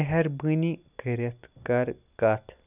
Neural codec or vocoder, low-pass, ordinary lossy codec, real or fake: none; 3.6 kHz; none; real